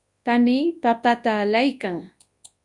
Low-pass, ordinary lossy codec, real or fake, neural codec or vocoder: 10.8 kHz; Opus, 64 kbps; fake; codec, 24 kHz, 0.9 kbps, WavTokenizer, large speech release